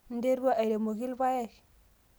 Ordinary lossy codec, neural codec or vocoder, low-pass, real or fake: none; none; none; real